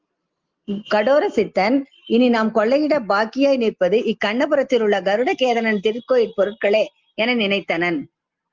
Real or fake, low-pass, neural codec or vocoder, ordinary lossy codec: real; 7.2 kHz; none; Opus, 32 kbps